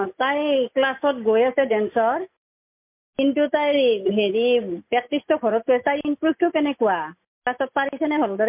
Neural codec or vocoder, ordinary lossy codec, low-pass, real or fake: none; MP3, 32 kbps; 3.6 kHz; real